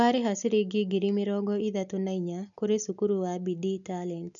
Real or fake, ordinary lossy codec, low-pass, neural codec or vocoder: real; none; 7.2 kHz; none